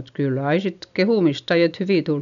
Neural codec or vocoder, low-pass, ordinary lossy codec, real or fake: none; 7.2 kHz; none; real